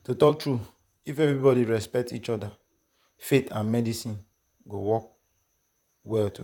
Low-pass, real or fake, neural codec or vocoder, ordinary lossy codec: 19.8 kHz; fake; vocoder, 44.1 kHz, 128 mel bands, Pupu-Vocoder; none